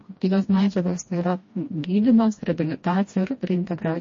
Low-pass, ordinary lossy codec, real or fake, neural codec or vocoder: 7.2 kHz; MP3, 32 kbps; fake; codec, 16 kHz, 1 kbps, FreqCodec, smaller model